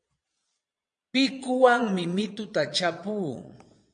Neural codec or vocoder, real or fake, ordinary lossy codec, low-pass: vocoder, 22.05 kHz, 80 mel bands, Vocos; fake; MP3, 48 kbps; 9.9 kHz